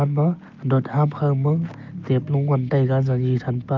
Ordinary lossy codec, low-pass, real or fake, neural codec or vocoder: Opus, 32 kbps; 7.2 kHz; real; none